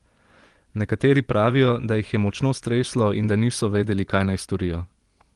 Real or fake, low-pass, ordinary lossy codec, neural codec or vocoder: fake; 10.8 kHz; Opus, 24 kbps; vocoder, 24 kHz, 100 mel bands, Vocos